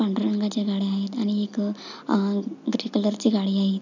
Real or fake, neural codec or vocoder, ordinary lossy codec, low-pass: real; none; none; 7.2 kHz